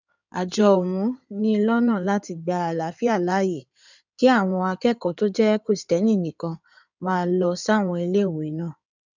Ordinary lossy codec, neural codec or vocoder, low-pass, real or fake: none; codec, 16 kHz in and 24 kHz out, 2.2 kbps, FireRedTTS-2 codec; 7.2 kHz; fake